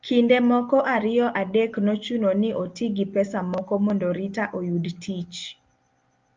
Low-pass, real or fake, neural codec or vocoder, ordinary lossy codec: 7.2 kHz; real; none; Opus, 24 kbps